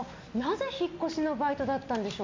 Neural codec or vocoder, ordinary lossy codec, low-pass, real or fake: none; MP3, 48 kbps; 7.2 kHz; real